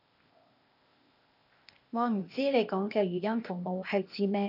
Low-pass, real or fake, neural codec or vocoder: 5.4 kHz; fake; codec, 16 kHz, 0.8 kbps, ZipCodec